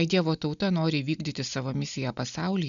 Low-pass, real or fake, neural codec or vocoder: 7.2 kHz; real; none